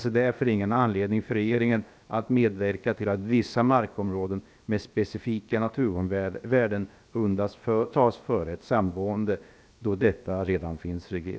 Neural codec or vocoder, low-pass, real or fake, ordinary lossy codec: codec, 16 kHz, about 1 kbps, DyCAST, with the encoder's durations; none; fake; none